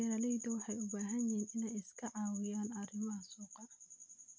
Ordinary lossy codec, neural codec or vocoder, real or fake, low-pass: none; none; real; none